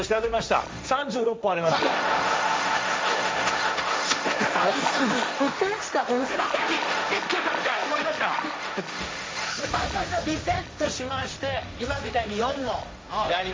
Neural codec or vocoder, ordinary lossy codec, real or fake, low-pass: codec, 16 kHz, 1.1 kbps, Voila-Tokenizer; none; fake; none